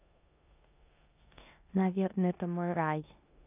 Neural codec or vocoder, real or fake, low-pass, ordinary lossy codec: codec, 16 kHz in and 24 kHz out, 0.9 kbps, LongCat-Audio-Codec, four codebook decoder; fake; 3.6 kHz; none